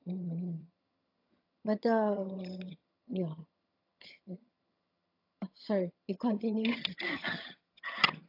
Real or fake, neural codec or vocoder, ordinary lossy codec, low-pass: fake; vocoder, 22.05 kHz, 80 mel bands, HiFi-GAN; AAC, 32 kbps; 5.4 kHz